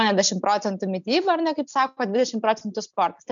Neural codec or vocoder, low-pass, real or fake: none; 7.2 kHz; real